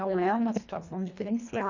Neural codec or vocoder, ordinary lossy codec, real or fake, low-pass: codec, 24 kHz, 1.5 kbps, HILCodec; none; fake; 7.2 kHz